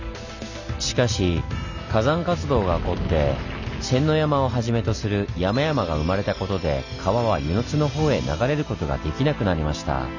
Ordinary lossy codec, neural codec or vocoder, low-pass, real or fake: none; none; 7.2 kHz; real